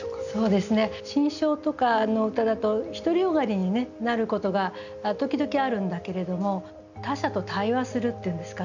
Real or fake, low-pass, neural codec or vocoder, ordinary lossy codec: real; 7.2 kHz; none; none